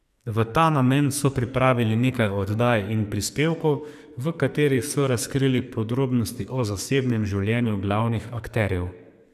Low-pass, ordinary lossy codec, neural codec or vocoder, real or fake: 14.4 kHz; none; codec, 44.1 kHz, 2.6 kbps, SNAC; fake